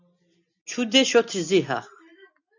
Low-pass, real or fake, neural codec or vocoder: 7.2 kHz; real; none